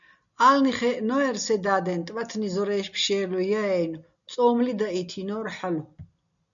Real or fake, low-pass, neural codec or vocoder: real; 7.2 kHz; none